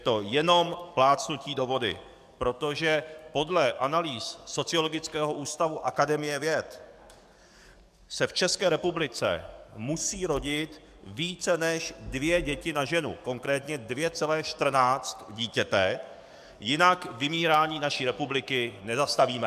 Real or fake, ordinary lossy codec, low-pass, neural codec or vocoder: fake; MP3, 96 kbps; 14.4 kHz; codec, 44.1 kHz, 7.8 kbps, DAC